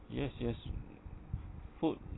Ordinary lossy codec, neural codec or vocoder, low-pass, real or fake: AAC, 16 kbps; none; 7.2 kHz; real